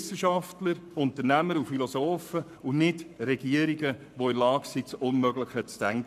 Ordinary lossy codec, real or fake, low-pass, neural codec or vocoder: none; fake; 14.4 kHz; codec, 44.1 kHz, 7.8 kbps, Pupu-Codec